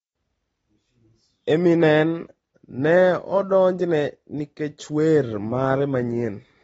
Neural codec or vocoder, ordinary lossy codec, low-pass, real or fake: none; AAC, 24 kbps; 19.8 kHz; real